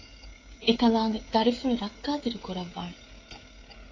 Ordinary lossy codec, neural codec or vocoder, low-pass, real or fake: AAC, 32 kbps; codec, 16 kHz, 16 kbps, FreqCodec, smaller model; 7.2 kHz; fake